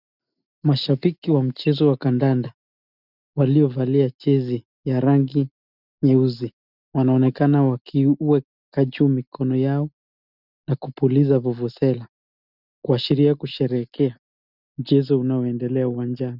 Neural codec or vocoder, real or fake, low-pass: none; real; 5.4 kHz